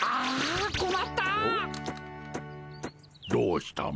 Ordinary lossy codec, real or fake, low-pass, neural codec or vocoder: none; real; none; none